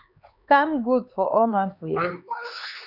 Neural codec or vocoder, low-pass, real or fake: codec, 16 kHz, 4 kbps, X-Codec, HuBERT features, trained on LibriSpeech; 5.4 kHz; fake